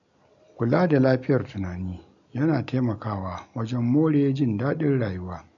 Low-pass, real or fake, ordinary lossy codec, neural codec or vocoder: 7.2 kHz; real; none; none